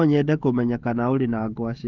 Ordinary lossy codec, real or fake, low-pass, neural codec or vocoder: Opus, 32 kbps; fake; 7.2 kHz; codec, 16 kHz, 16 kbps, FreqCodec, smaller model